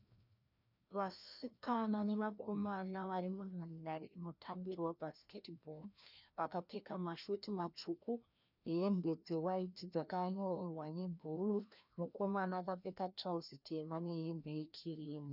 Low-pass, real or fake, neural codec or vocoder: 5.4 kHz; fake; codec, 16 kHz, 1 kbps, FreqCodec, larger model